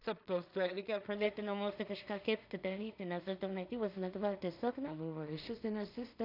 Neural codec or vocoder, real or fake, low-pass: codec, 16 kHz in and 24 kHz out, 0.4 kbps, LongCat-Audio-Codec, two codebook decoder; fake; 5.4 kHz